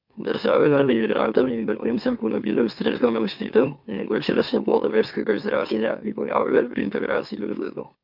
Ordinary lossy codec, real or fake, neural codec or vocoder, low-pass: MP3, 48 kbps; fake; autoencoder, 44.1 kHz, a latent of 192 numbers a frame, MeloTTS; 5.4 kHz